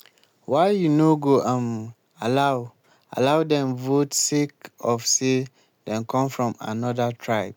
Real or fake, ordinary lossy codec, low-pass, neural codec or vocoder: real; none; none; none